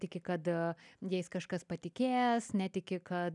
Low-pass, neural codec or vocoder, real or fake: 10.8 kHz; none; real